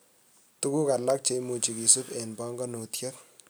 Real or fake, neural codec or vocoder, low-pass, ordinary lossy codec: real; none; none; none